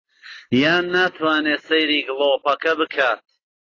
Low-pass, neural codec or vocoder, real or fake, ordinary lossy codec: 7.2 kHz; none; real; AAC, 32 kbps